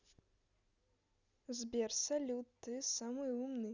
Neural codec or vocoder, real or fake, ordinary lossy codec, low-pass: none; real; none; 7.2 kHz